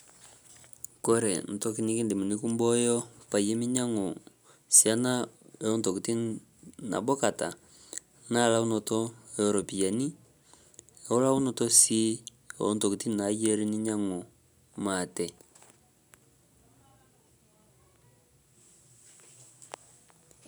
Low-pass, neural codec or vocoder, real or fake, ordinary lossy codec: none; none; real; none